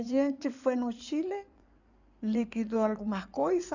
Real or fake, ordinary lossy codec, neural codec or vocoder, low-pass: fake; none; codec, 16 kHz in and 24 kHz out, 2.2 kbps, FireRedTTS-2 codec; 7.2 kHz